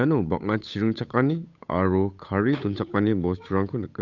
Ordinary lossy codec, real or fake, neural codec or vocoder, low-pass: none; fake; codec, 16 kHz, 8 kbps, FunCodec, trained on Chinese and English, 25 frames a second; 7.2 kHz